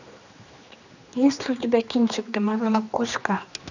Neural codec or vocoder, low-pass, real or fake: codec, 16 kHz, 2 kbps, X-Codec, HuBERT features, trained on general audio; 7.2 kHz; fake